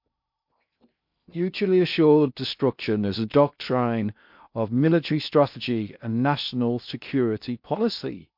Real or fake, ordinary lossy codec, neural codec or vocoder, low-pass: fake; MP3, 48 kbps; codec, 16 kHz in and 24 kHz out, 0.6 kbps, FocalCodec, streaming, 2048 codes; 5.4 kHz